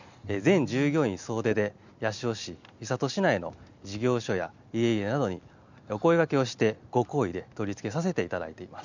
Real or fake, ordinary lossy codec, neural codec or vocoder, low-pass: real; none; none; 7.2 kHz